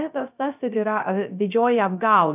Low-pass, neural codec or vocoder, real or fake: 3.6 kHz; codec, 16 kHz, 0.3 kbps, FocalCodec; fake